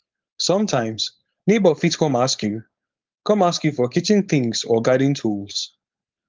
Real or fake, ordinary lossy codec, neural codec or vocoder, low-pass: fake; Opus, 32 kbps; codec, 16 kHz, 4.8 kbps, FACodec; 7.2 kHz